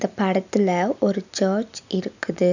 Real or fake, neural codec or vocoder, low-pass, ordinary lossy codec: real; none; 7.2 kHz; none